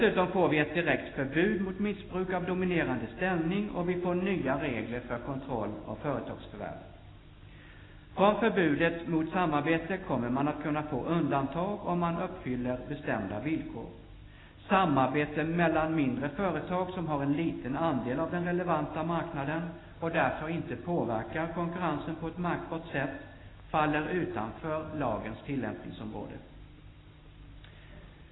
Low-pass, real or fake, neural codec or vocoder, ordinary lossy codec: 7.2 kHz; real; none; AAC, 16 kbps